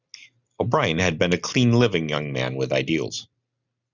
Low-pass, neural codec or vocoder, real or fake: 7.2 kHz; none; real